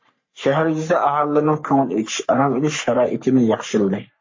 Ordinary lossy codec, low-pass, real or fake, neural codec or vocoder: MP3, 32 kbps; 7.2 kHz; fake; codec, 44.1 kHz, 3.4 kbps, Pupu-Codec